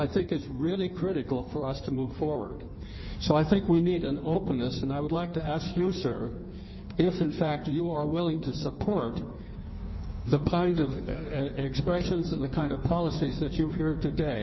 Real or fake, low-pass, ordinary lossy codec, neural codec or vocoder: fake; 7.2 kHz; MP3, 24 kbps; codec, 16 kHz in and 24 kHz out, 1.1 kbps, FireRedTTS-2 codec